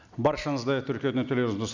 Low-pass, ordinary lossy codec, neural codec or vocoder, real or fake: 7.2 kHz; none; none; real